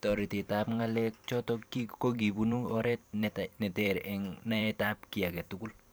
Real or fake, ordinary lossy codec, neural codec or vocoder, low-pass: fake; none; vocoder, 44.1 kHz, 128 mel bands every 512 samples, BigVGAN v2; none